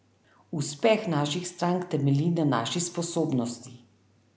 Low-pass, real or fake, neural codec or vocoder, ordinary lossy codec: none; real; none; none